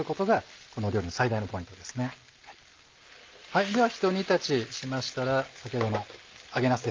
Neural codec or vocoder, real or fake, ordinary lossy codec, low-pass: none; real; Opus, 32 kbps; 7.2 kHz